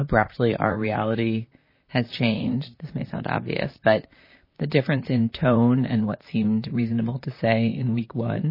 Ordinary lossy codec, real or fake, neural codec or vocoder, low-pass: MP3, 24 kbps; fake; vocoder, 44.1 kHz, 128 mel bands, Pupu-Vocoder; 5.4 kHz